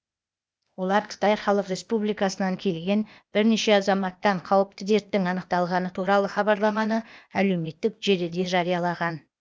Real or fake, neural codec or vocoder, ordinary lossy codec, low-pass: fake; codec, 16 kHz, 0.8 kbps, ZipCodec; none; none